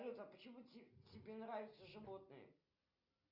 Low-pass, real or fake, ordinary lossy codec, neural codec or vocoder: 5.4 kHz; real; Opus, 64 kbps; none